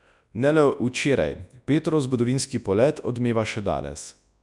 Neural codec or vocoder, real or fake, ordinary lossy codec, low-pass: codec, 24 kHz, 0.9 kbps, WavTokenizer, large speech release; fake; none; 10.8 kHz